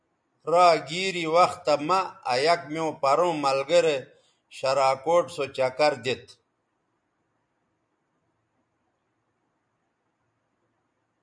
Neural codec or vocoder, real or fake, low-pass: none; real; 9.9 kHz